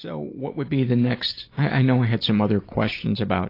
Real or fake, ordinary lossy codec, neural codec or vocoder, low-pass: real; AAC, 32 kbps; none; 5.4 kHz